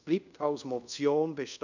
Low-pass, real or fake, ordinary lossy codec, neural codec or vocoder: 7.2 kHz; fake; none; codec, 24 kHz, 0.5 kbps, DualCodec